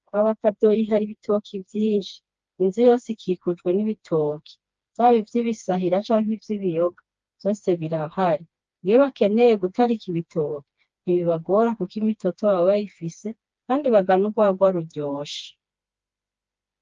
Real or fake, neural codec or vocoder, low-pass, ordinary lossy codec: fake; codec, 16 kHz, 2 kbps, FreqCodec, smaller model; 7.2 kHz; Opus, 24 kbps